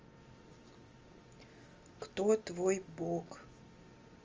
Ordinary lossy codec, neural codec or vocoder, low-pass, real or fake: Opus, 32 kbps; none; 7.2 kHz; real